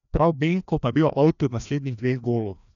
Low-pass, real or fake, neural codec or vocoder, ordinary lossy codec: 7.2 kHz; fake; codec, 16 kHz, 1 kbps, FreqCodec, larger model; none